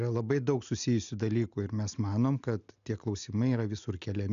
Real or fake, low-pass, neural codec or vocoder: real; 7.2 kHz; none